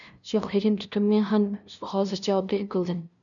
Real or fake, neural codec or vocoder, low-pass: fake; codec, 16 kHz, 0.5 kbps, FunCodec, trained on LibriTTS, 25 frames a second; 7.2 kHz